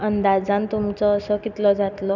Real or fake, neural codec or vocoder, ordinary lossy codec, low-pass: real; none; none; 7.2 kHz